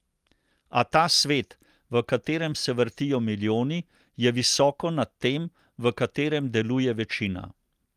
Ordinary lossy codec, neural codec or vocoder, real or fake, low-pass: Opus, 32 kbps; none; real; 14.4 kHz